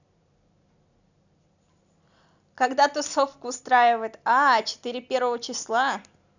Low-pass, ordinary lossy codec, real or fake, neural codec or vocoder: 7.2 kHz; none; real; none